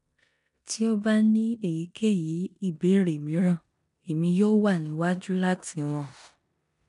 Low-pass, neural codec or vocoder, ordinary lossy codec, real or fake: 10.8 kHz; codec, 16 kHz in and 24 kHz out, 0.9 kbps, LongCat-Audio-Codec, four codebook decoder; none; fake